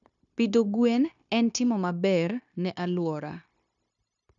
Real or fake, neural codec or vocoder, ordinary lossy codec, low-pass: fake; codec, 16 kHz, 0.9 kbps, LongCat-Audio-Codec; none; 7.2 kHz